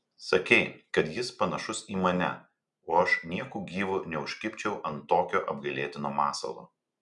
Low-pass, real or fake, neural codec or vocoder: 10.8 kHz; real; none